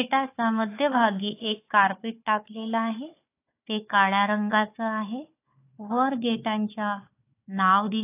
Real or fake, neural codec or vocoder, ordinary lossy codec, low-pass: fake; codec, 16 kHz, 4 kbps, FunCodec, trained on Chinese and English, 50 frames a second; AAC, 24 kbps; 3.6 kHz